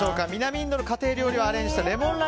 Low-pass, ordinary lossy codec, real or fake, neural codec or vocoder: none; none; real; none